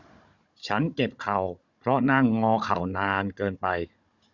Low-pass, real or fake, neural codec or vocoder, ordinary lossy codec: 7.2 kHz; fake; codec, 16 kHz, 16 kbps, FunCodec, trained on Chinese and English, 50 frames a second; Opus, 64 kbps